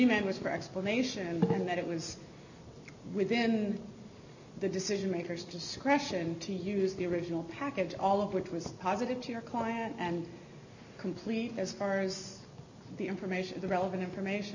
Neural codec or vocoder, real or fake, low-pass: none; real; 7.2 kHz